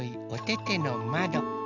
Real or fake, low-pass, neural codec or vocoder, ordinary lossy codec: real; 7.2 kHz; none; none